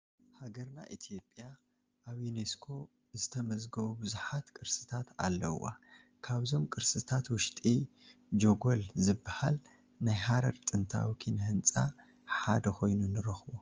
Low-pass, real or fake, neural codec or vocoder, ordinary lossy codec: 7.2 kHz; real; none; Opus, 24 kbps